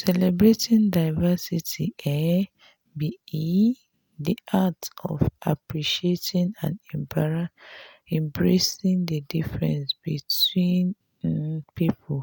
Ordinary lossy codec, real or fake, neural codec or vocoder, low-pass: none; real; none; none